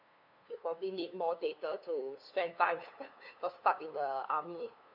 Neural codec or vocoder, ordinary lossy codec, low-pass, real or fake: codec, 16 kHz, 2 kbps, FunCodec, trained on LibriTTS, 25 frames a second; none; 5.4 kHz; fake